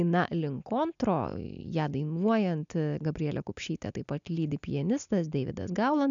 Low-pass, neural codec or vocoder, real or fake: 7.2 kHz; none; real